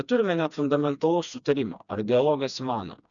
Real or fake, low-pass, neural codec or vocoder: fake; 7.2 kHz; codec, 16 kHz, 2 kbps, FreqCodec, smaller model